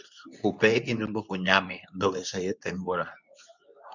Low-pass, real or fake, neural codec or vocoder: 7.2 kHz; fake; codec, 24 kHz, 0.9 kbps, WavTokenizer, medium speech release version 2